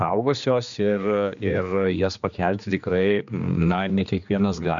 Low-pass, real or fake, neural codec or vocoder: 7.2 kHz; fake; codec, 16 kHz, 2 kbps, X-Codec, HuBERT features, trained on general audio